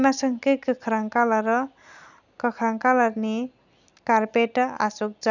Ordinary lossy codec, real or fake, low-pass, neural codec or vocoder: none; real; 7.2 kHz; none